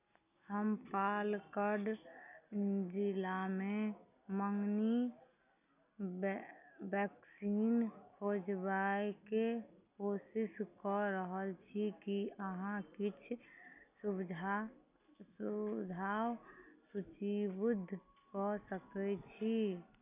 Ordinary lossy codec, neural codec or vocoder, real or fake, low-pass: none; none; real; 3.6 kHz